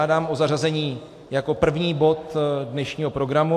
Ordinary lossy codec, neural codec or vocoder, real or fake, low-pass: AAC, 64 kbps; none; real; 14.4 kHz